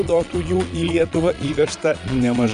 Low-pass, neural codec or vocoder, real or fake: 9.9 kHz; vocoder, 22.05 kHz, 80 mel bands, Vocos; fake